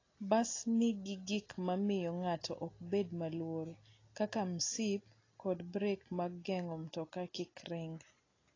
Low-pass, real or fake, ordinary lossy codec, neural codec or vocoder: 7.2 kHz; real; AAC, 32 kbps; none